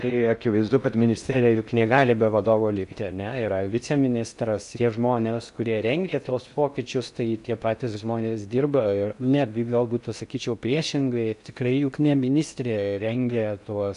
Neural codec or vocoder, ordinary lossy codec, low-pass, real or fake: codec, 16 kHz in and 24 kHz out, 0.6 kbps, FocalCodec, streaming, 4096 codes; MP3, 96 kbps; 10.8 kHz; fake